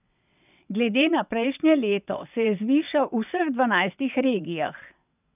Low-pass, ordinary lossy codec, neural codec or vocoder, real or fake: 3.6 kHz; none; vocoder, 22.05 kHz, 80 mel bands, WaveNeXt; fake